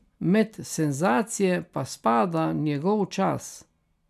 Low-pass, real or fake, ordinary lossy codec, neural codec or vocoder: 14.4 kHz; real; none; none